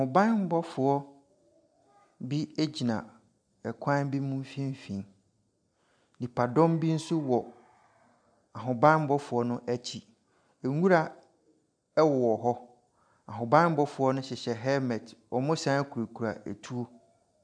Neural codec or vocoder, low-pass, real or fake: none; 9.9 kHz; real